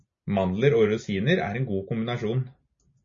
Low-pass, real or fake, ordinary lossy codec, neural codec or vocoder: 7.2 kHz; real; MP3, 32 kbps; none